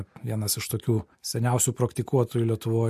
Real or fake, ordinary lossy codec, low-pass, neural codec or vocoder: real; MP3, 64 kbps; 14.4 kHz; none